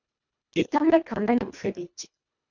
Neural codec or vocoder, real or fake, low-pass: codec, 24 kHz, 1.5 kbps, HILCodec; fake; 7.2 kHz